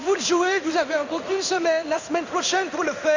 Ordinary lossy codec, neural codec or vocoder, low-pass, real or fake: Opus, 64 kbps; codec, 16 kHz in and 24 kHz out, 1 kbps, XY-Tokenizer; 7.2 kHz; fake